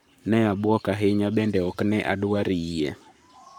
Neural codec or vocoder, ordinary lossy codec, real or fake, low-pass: codec, 44.1 kHz, 7.8 kbps, Pupu-Codec; none; fake; 19.8 kHz